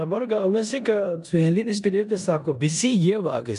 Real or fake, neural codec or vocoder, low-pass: fake; codec, 16 kHz in and 24 kHz out, 0.9 kbps, LongCat-Audio-Codec, four codebook decoder; 10.8 kHz